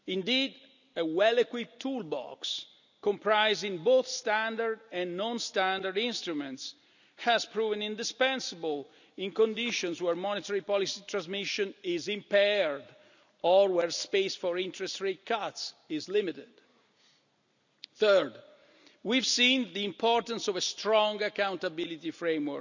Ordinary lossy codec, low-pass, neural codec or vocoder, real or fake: none; 7.2 kHz; none; real